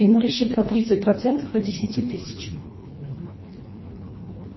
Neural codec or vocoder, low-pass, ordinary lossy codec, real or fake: codec, 24 kHz, 1.5 kbps, HILCodec; 7.2 kHz; MP3, 24 kbps; fake